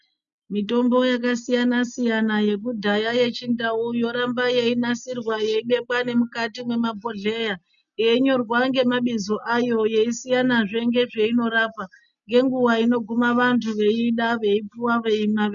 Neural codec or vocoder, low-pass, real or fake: none; 7.2 kHz; real